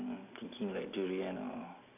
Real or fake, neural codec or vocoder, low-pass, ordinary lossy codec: real; none; 3.6 kHz; AAC, 32 kbps